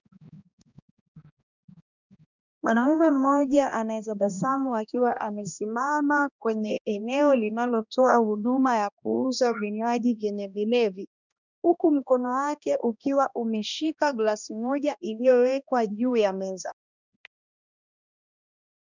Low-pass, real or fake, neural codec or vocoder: 7.2 kHz; fake; codec, 16 kHz, 1 kbps, X-Codec, HuBERT features, trained on balanced general audio